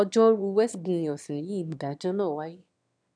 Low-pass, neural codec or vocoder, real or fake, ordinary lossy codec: 9.9 kHz; autoencoder, 22.05 kHz, a latent of 192 numbers a frame, VITS, trained on one speaker; fake; none